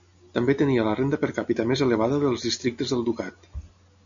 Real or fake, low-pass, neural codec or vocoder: real; 7.2 kHz; none